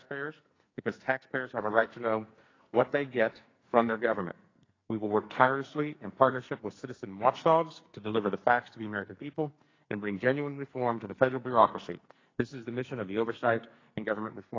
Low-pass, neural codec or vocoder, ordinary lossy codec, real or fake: 7.2 kHz; codec, 44.1 kHz, 2.6 kbps, SNAC; AAC, 32 kbps; fake